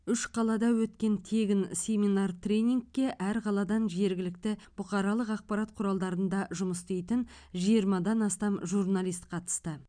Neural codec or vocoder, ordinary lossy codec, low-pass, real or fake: none; none; 9.9 kHz; real